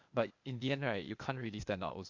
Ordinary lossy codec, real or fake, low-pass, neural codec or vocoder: Opus, 64 kbps; fake; 7.2 kHz; codec, 16 kHz, 0.8 kbps, ZipCodec